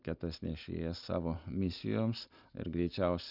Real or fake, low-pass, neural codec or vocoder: real; 5.4 kHz; none